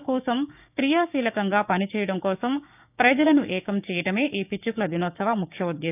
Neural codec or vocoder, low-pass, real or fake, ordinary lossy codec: codec, 16 kHz, 6 kbps, DAC; 3.6 kHz; fake; none